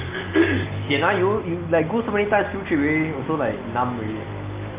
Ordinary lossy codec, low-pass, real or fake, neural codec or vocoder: Opus, 32 kbps; 3.6 kHz; real; none